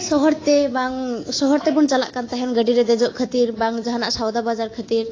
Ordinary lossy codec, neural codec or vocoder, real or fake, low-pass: AAC, 32 kbps; codec, 24 kHz, 3.1 kbps, DualCodec; fake; 7.2 kHz